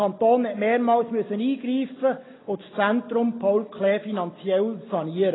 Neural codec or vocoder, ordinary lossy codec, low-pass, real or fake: none; AAC, 16 kbps; 7.2 kHz; real